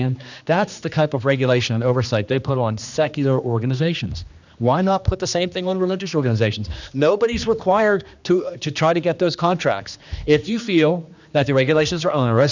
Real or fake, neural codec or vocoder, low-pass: fake; codec, 16 kHz, 2 kbps, X-Codec, HuBERT features, trained on general audio; 7.2 kHz